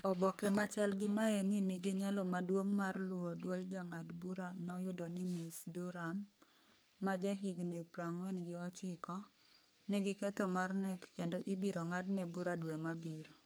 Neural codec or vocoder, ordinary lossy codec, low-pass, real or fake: codec, 44.1 kHz, 3.4 kbps, Pupu-Codec; none; none; fake